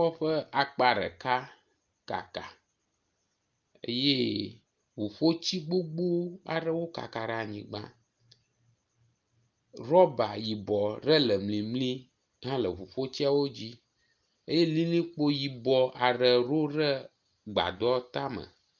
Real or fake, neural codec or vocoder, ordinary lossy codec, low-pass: real; none; Opus, 24 kbps; 7.2 kHz